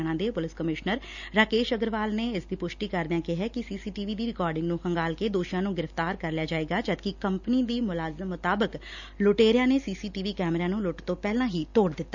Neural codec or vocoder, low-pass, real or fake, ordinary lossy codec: none; 7.2 kHz; real; none